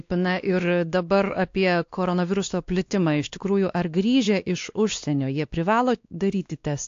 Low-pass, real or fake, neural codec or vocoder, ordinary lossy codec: 7.2 kHz; fake; codec, 16 kHz, 2 kbps, X-Codec, WavLM features, trained on Multilingual LibriSpeech; AAC, 48 kbps